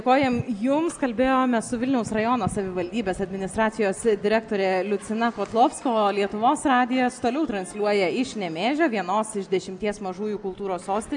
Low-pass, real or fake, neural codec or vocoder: 9.9 kHz; real; none